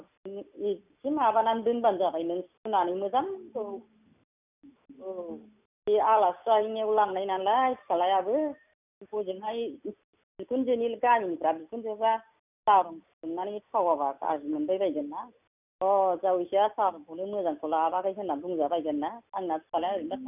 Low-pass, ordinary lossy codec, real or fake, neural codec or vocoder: 3.6 kHz; none; real; none